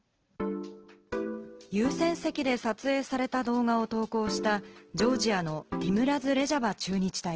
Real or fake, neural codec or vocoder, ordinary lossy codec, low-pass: real; none; Opus, 16 kbps; 7.2 kHz